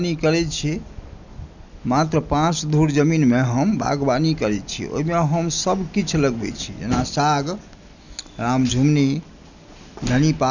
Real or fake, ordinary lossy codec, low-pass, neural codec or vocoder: real; none; 7.2 kHz; none